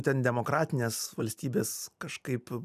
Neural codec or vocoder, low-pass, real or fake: none; 14.4 kHz; real